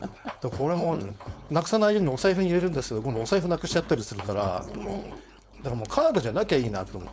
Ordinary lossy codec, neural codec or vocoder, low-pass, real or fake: none; codec, 16 kHz, 4.8 kbps, FACodec; none; fake